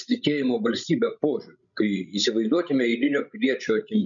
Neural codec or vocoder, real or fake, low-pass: codec, 16 kHz, 16 kbps, FreqCodec, larger model; fake; 7.2 kHz